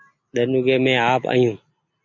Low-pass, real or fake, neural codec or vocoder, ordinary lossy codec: 7.2 kHz; real; none; MP3, 48 kbps